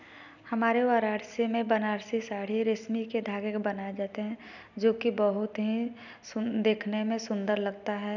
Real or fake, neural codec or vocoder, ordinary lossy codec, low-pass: real; none; none; 7.2 kHz